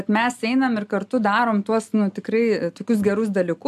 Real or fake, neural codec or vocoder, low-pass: real; none; 14.4 kHz